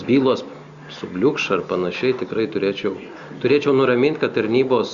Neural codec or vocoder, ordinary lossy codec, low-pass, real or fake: none; Opus, 64 kbps; 7.2 kHz; real